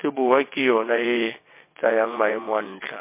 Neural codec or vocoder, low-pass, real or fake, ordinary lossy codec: vocoder, 22.05 kHz, 80 mel bands, WaveNeXt; 3.6 kHz; fake; MP3, 24 kbps